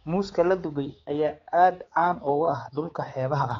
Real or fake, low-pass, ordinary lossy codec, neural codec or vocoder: fake; 7.2 kHz; AAC, 32 kbps; codec, 16 kHz, 4 kbps, X-Codec, HuBERT features, trained on balanced general audio